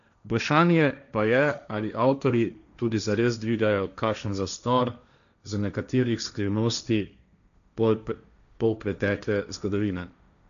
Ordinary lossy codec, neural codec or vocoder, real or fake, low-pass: none; codec, 16 kHz, 1.1 kbps, Voila-Tokenizer; fake; 7.2 kHz